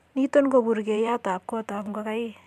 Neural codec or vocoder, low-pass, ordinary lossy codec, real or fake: vocoder, 44.1 kHz, 128 mel bands every 512 samples, BigVGAN v2; 14.4 kHz; MP3, 96 kbps; fake